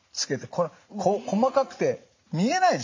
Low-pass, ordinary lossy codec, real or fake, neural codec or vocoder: 7.2 kHz; MP3, 48 kbps; fake; vocoder, 44.1 kHz, 80 mel bands, Vocos